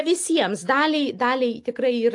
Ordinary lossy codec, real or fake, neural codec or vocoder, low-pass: AAC, 64 kbps; real; none; 10.8 kHz